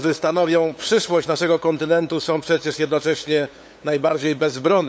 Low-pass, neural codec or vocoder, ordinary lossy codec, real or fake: none; codec, 16 kHz, 8 kbps, FunCodec, trained on LibriTTS, 25 frames a second; none; fake